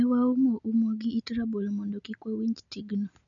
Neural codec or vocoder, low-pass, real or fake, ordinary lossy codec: none; 7.2 kHz; real; none